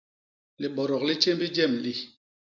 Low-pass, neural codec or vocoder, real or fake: 7.2 kHz; none; real